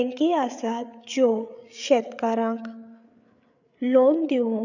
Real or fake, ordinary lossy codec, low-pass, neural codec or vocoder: fake; none; 7.2 kHz; codec, 16 kHz, 8 kbps, FreqCodec, larger model